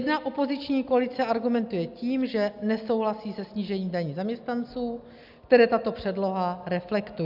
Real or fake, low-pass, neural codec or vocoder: real; 5.4 kHz; none